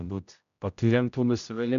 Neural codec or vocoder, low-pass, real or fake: codec, 16 kHz, 0.5 kbps, X-Codec, HuBERT features, trained on general audio; 7.2 kHz; fake